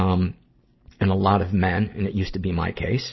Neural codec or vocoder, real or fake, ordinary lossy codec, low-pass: none; real; MP3, 24 kbps; 7.2 kHz